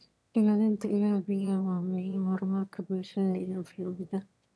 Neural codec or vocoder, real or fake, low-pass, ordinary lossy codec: autoencoder, 22.05 kHz, a latent of 192 numbers a frame, VITS, trained on one speaker; fake; none; none